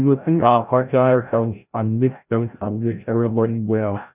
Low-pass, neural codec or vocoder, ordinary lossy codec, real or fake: 3.6 kHz; codec, 16 kHz, 0.5 kbps, FreqCodec, larger model; none; fake